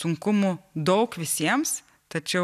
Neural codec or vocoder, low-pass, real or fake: none; 14.4 kHz; real